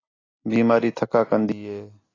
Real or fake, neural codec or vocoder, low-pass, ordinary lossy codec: real; none; 7.2 kHz; AAC, 32 kbps